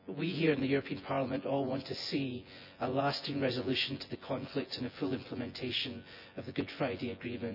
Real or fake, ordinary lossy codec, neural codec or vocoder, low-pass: fake; none; vocoder, 24 kHz, 100 mel bands, Vocos; 5.4 kHz